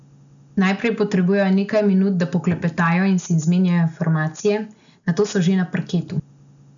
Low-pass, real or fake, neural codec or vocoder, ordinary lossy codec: 7.2 kHz; real; none; none